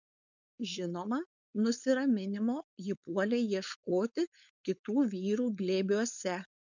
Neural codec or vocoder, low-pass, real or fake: codec, 16 kHz, 4.8 kbps, FACodec; 7.2 kHz; fake